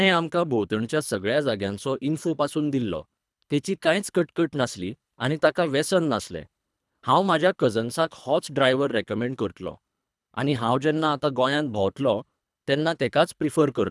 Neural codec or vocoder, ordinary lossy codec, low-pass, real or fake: codec, 24 kHz, 3 kbps, HILCodec; none; none; fake